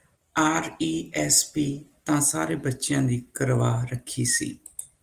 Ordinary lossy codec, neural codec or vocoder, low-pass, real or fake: Opus, 24 kbps; none; 14.4 kHz; real